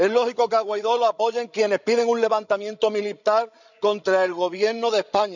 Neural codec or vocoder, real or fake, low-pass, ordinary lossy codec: codec, 16 kHz, 16 kbps, FreqCodec, larger model; fake; 7.2 kHz; none